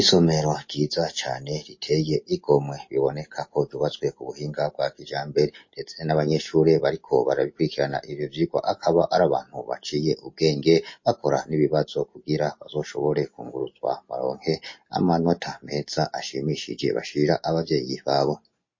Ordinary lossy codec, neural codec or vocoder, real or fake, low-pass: MP3, 32 kbps; none; real; 7.2 kHz